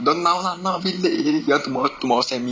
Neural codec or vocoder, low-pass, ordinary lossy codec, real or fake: none; none; none; real